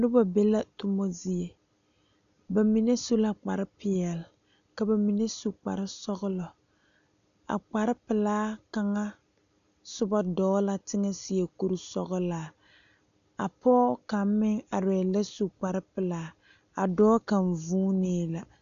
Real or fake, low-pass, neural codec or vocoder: real; 7.2 kHz; none